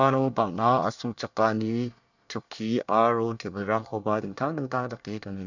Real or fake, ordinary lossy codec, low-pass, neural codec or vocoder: fake; none; 7.2 kHz; codec, 24 kHz, 1 kbps, SNAC